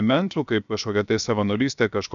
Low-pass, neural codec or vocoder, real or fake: 7.2 kHz; codec, 16 kHz, 0.7 kbps, FocalCodec; fake